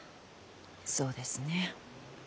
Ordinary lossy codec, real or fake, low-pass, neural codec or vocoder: none; real; none; none